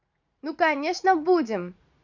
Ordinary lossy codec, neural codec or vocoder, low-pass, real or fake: none; vocoder, 44.1 kHz, 80 mel bands, Vocos; 7.2 kHz; fake